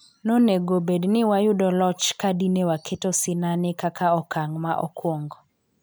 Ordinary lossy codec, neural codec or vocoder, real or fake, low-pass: none; none; real; none